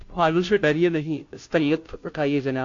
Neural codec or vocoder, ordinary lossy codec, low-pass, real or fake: codec, 16 kHz, 0.5 kbps, FunCodec, trained on Chinese and English, 25 frames a second; AAC, 48 kbps; 7.2 kHz; fake